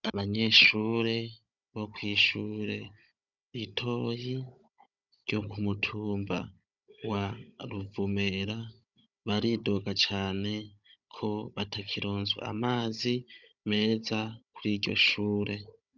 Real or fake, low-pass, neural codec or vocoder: fake; 7.2 kHz; codec, 16 kHz, 16 kbps, FunCodec, trained on Chinese and English, 50 frames a second